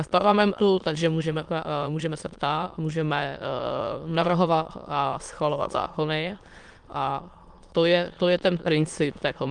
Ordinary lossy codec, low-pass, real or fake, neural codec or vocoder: Opus, 32 kbps; 9.9 kHz; fake; autoencoder, 22.05 kHz, a latent of 192 numbers a frame, VITS, trained on many speakers